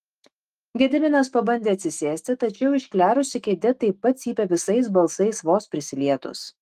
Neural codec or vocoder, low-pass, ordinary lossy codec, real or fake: autoencoder, 48 kHz, 128 numbers a frame, DAC-VAE, trained on Japanese speech; 14.4 kHz; Opus, 24 kbps; fake